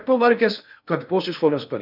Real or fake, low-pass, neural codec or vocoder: fake; 5.4 kHz; codec, 16 kHz in and 24 kHz out, 0.6 kbps, FocalCodec, streaming, 2048 codes